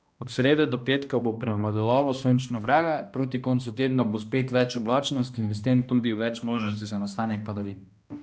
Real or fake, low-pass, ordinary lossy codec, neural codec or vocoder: fake; none; none; codec, 16 kHz, 1 kbps, X-Codec, HuBERT features, trained on balanced general audio